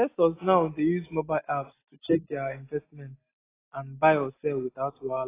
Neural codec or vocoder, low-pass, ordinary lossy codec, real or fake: none; 3.6 kHz; AAC, 16 kbps; real